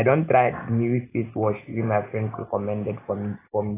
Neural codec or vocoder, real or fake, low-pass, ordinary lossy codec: none; real; 3.6 kHz; AAC, 16 kbps